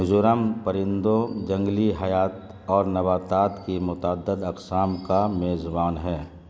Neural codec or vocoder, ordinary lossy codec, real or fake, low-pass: none; none; real; none